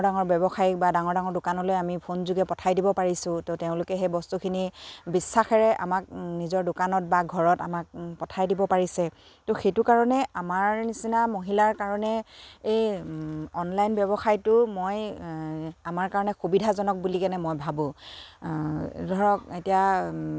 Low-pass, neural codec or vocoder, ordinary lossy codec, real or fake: none; none; none; real